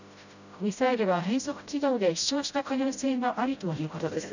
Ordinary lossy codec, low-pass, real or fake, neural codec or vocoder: none; 7.2 kHz; fake; codec, 16 kHz, 0.5 kbps, FreqCodec, smaller model